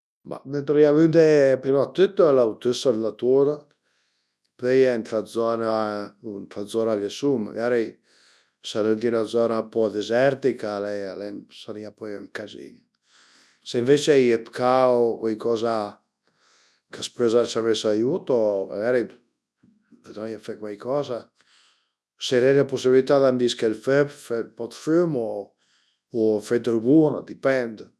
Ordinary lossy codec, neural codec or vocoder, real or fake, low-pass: none; codec, 24 kHz, 0.9 kbps, WavTokenizer, large speech release; fake; none